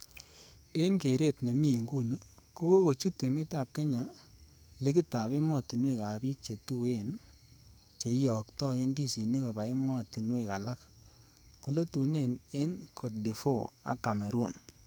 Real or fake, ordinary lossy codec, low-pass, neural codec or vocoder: fake; none; none; codec, 44.1 kHz, 2.6 kbps, SNAC